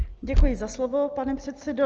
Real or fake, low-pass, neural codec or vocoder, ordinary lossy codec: real; 7.2 kHz; none; Opus, 24 kbps